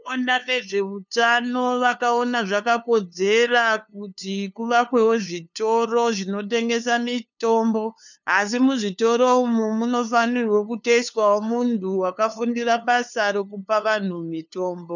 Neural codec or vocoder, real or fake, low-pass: codec, 16 kHz, 2 kbps, FunCodec, trained on LibriTTS, 25 frames a second; fake; 7.2 kHz